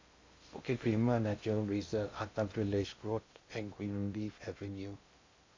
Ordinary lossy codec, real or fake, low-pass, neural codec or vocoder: MP3, 48 kbps; fake; 7.2 kHz; codec, 16 kHz in and 24 kHz out, 0.6 kbps, FocalCodec, streaming, 2048 codes